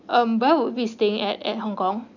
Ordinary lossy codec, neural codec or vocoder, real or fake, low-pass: none; none; real; 7.2 kHz